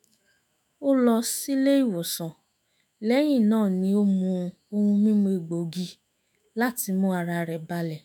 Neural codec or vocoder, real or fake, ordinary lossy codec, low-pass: autoencoder, 48 kHz, 128 numbers a frame, DAC-VAE, trained on Japanese speech; fake; none; none